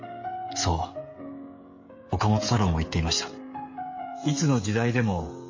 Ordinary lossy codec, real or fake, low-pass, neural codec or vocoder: MP3, 32 kbps; fake; 7.2 kHz; codec, 16 kHz, 16 kbps, FreqCodec, smaller model